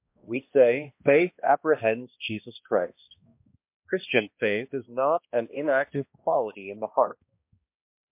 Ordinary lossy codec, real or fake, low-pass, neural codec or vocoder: MP3, 32 kbps; fake; 3.6 kHz; codec, 16 kHz, 1 kbps, X-Codec, HuBERT features, trained on balanced general audio